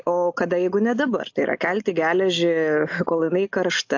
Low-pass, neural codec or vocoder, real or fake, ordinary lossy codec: 7.2 kHz; none; real; AAC, 48 kbps